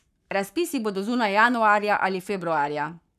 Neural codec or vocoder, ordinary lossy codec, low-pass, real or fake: codec, 44.1 kHz, 7.8 kbps, Pupu-Codec; none; 14.4 kHz; fake